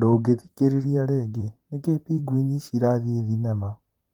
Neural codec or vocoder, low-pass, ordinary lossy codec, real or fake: vocoder, 44.1 kHz, 128 mel bands, Pupu-Vocoder; 19.8 kHz; Opus, 32 kbps; fake